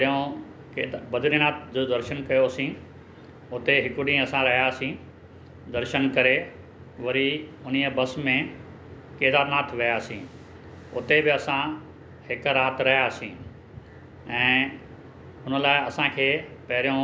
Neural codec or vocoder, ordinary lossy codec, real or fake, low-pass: none; none; real; none